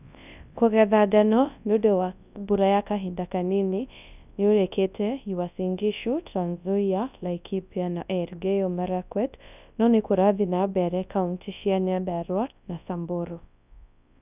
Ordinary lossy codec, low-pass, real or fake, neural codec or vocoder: none; 3.6 kHz; fake; codec, 24 kHz, 0.9 kbps, WavTokenizer, large speech release